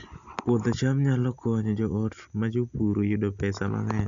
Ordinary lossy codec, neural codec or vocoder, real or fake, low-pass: none; none; real; 7.2 kHz